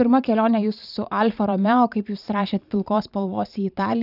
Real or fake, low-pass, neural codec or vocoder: fake; 5.4 kHz; vocoder, 44.1 kHz, 128 mel bands every 512 samples, BigVGAN v2